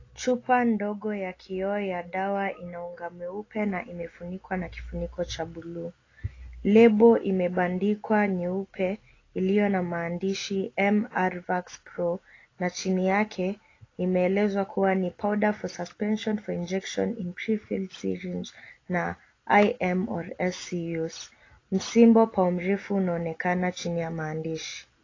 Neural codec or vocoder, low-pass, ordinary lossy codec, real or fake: none; 7.2 kHz; AAC, 32 kbps; real